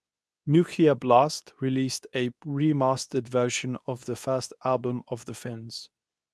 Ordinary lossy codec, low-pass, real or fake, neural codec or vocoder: none; none; fake; codec, 24 kHz, 0.9 kbps, WavTokenizer, medium speech release version 2